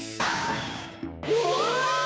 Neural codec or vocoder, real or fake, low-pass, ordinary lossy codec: codec, 16 kHz, 6 kbps, DAC; fake; none; none